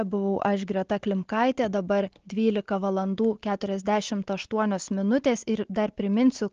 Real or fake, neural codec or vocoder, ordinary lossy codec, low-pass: real; none; Opus, 16 kbps; 7.2 kHz